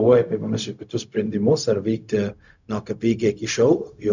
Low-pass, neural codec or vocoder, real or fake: 7.2 kHz; codec, 16 kHz, 0.4 kbps, LongCat-Audio-Codec; fake